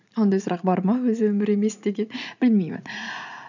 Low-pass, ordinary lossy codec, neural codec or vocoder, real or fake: 7.2 kHz; none; vocoder, 44.1 kHz, 80 mel bands, Vocos; fake